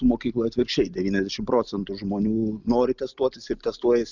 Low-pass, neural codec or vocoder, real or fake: 7.2 kHz; none; real